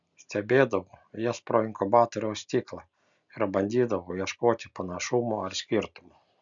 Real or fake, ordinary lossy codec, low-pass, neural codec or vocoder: real; MP3, 96 kbps; 7.2 kHz; none